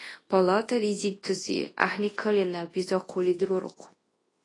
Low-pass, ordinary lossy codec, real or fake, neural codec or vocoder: 10.8 kHz; AAC, 32 kbps; fake; codec, 24 kHz, 0.9 kbps, WavTokenizer, large speech release